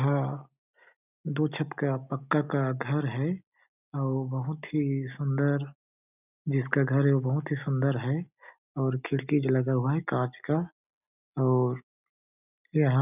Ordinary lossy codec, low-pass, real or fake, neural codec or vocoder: none; 3.6 kHz; real; none